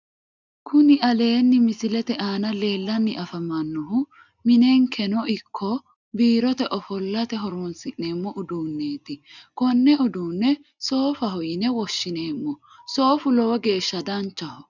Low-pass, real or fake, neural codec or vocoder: 7.2 kHz; real; none